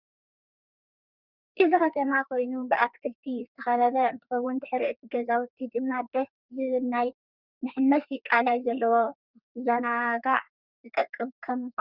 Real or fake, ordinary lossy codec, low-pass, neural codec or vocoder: fake; Opus, 64 kbps; 5.4 kHz; codec, 32 kHz, 1.9 kbps, SNAC